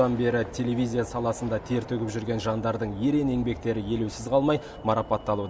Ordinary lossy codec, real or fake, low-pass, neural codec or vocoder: none; real; none; none